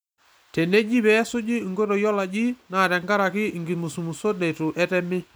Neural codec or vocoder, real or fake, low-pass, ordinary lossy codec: none; real; none; none